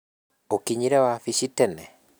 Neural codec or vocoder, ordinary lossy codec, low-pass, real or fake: none; none; none; real